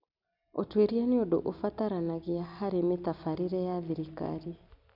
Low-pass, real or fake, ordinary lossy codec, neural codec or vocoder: 5.4 kHz; real; none; none